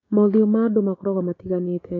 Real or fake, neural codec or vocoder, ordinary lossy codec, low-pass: fake; codec, 16 kHz, 6 kbps, DAC; AAC, 32 kbps; 7.2 kHz